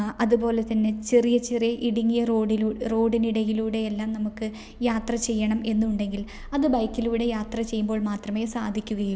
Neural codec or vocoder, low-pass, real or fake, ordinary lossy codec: none; none; real; none